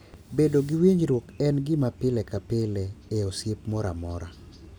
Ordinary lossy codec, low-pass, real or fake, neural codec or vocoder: none; none; real; none